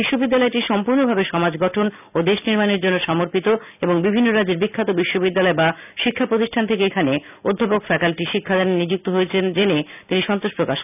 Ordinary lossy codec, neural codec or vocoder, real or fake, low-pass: none; none; real; 3.6 kHz